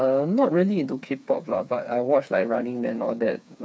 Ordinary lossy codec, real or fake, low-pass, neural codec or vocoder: none; fake; none; codec, 16 kHz, 4 kbps, FreqCodec, smaller model